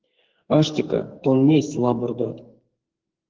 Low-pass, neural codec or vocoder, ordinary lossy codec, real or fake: 7.2 kHz; codec, 44.1 kHz, 2.6 kbps, SNAC; Opus, 16 kbps; fake